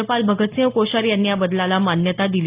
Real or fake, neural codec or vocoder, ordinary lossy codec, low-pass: real; none; Opus, 32 kbps; 3.6 kHz